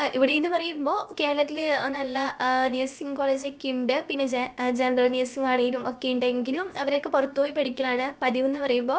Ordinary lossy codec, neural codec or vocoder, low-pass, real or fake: none; codec, 16 kHz, about 1 kbps, DyCAST, with the encoder's durations; none; fake